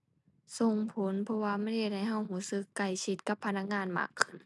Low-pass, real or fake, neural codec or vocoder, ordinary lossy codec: none; real; none; none